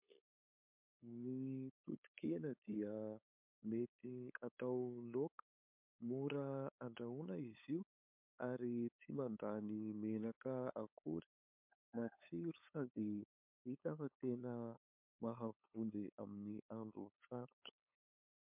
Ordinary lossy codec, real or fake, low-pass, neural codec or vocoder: MP3, 32 kbps; fake; 3.6 kHz; codec, 16 kHz, 8 kbps, FunCodec, trained on LibriTTS, 25 frames a second